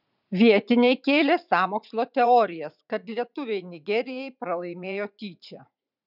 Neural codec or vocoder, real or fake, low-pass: vocoder, 44.1 kHz, 80 mel bands, Vocos; fake; 5.4 kHz